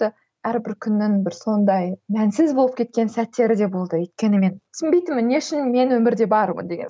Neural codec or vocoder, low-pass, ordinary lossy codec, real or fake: none; none; none; real